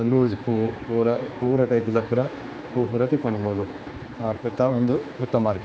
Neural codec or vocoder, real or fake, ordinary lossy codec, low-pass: codec, 16 kHz, 2 kbps, X-Codec, HuBERT features, trained on general audio; fake; none; none